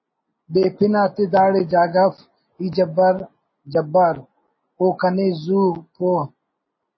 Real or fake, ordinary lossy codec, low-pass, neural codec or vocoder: real; MP3, 24 kbps; 7.2 kHz; none